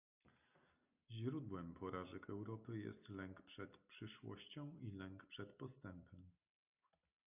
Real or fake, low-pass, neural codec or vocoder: real; 3.6 kHz; none